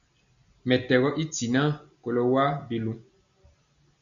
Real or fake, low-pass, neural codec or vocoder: real; 7.2 kHz; none